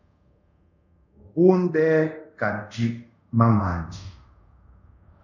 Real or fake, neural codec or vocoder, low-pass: fake; codec, 24 kHz, 0.5 kbps, DualCodec; 7.2 kHz